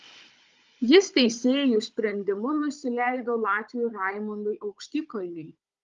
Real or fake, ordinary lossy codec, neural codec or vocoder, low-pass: fake; Opus, 32 kbps; codec, 16 kHz, 16 kbps, FunCodec, trained on Chinese and English, 50 frames a second; 7.2 kHz